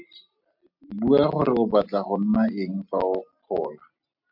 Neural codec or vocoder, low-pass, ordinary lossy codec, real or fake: none; 5.4 kHz; MP3, 48 kbps; real